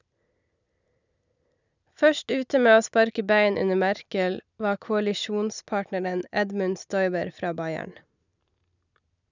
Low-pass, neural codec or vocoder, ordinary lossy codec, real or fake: 7.2 kHz; none; none; real